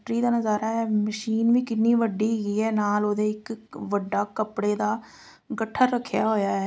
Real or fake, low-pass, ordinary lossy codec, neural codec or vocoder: real; none; none; none